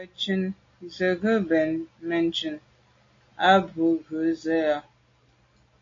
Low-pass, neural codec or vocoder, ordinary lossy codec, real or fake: 7.2 kHz; none; AAC, 32 kbps; real